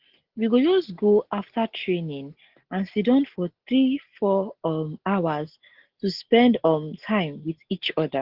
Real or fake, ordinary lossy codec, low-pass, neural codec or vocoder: real; Opus, 16 kbps; 5.4 kHz; none